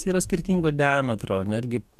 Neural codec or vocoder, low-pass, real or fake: codec, 44.1 kHz, 2.6 kbps, DAC; 14.4 kHz; fake